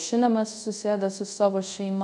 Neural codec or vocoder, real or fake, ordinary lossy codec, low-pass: codec, 24 kHz, 0.5 kbps, DualCodec; fake; MP3, 96 kbps; 10.8 kHz